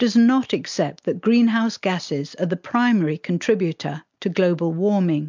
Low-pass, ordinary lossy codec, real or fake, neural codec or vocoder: 7.2 kHz; MP3, 64 kbps; real; none